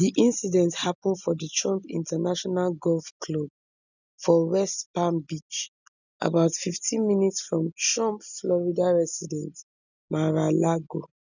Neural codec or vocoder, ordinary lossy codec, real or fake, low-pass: none; none; real; 7.2 kHz